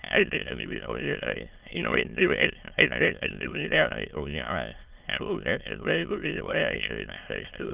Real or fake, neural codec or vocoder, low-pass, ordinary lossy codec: fake; autoencoder, 22.05 kHz, a latent of 192 numbers a frame, VITS, trained on many speakers; 3.6 kHz; Opus, 24 kbps